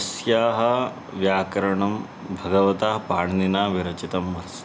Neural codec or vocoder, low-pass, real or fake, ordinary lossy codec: none; none; real; none